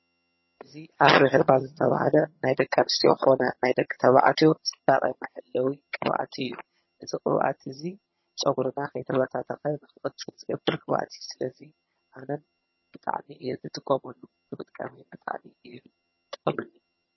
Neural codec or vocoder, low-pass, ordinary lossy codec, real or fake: vocoder, 22.05 kHz, 80 mel bands, HiFi-GAN; 7.2 kHz; MP3, 24 kbps; fake